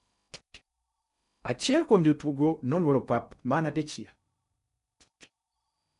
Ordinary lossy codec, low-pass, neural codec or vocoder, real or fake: none; 10.8 kHz; codec, 16 kHz in and 24 kHz out, 0.6 kbps, FocalCodec, streaming, 2048 codes; fake